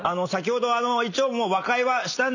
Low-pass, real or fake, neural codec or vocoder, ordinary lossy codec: 7.2 kHz; real; none; none